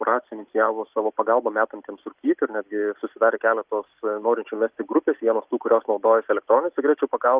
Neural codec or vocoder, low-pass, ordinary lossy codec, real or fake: none; 3.6 kHz; Opus, 32 kbps; real